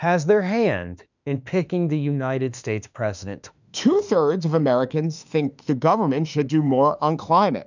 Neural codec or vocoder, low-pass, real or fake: autoencoder, 48 kHz, 32 numbers a frame, DAC-VAE, trained on Japanese speech; 7.2 kHz; fake